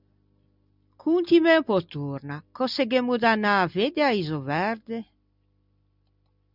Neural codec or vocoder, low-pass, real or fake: none; 5.4 kHz; real